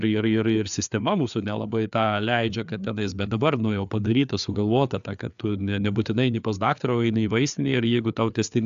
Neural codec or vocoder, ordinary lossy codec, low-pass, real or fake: codec, 16 kHz, 4 kbps, FreqCodec, larger model; AAC, 96 kbps; 7.2 kHz; fake